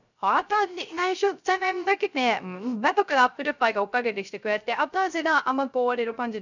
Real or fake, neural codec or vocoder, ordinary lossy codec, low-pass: fake; codec, 16 kHz, 0.3 kbps, FocalCodec; none; 7.2 kHz